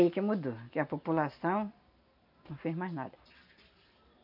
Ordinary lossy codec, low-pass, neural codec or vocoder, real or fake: none; 5.4 kHz; none; real